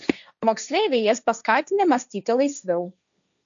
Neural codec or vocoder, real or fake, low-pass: codec, 16 kHz, 1.1 kbps, Voila-Tokenizer; fake; 7.2 kHz